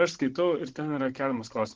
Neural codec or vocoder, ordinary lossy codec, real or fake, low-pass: codec, 16 kHz, 16 kbps, FunCodec, trained on Chinese and English, 50 frames a second; Opus, 16 kbps; fake; 7.2 kHz